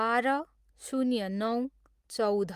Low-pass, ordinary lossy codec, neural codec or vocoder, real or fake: 14.4 kHz; Opus, 32 kbps; none; real